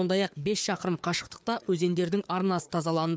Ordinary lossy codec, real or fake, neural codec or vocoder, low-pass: none; fake; codec, 16 kHz, 4 kbps, FreqCodec, larger model; none